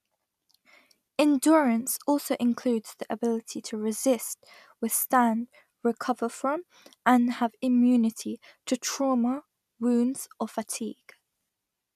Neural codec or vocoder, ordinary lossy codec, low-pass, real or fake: none; none; 14.4 kHz; real